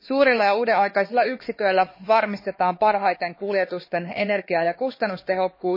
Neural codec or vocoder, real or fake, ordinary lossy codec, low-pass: codec, 16 kHz, 2 kbps, X-Codec, HuBERT features, trained on LibriSpeech; fake; MP3, 24 kbps; 5.4 kHz